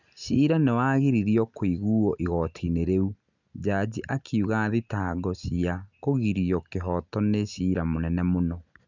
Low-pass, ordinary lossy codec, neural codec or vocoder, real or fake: 7.2 kHz; none; none; real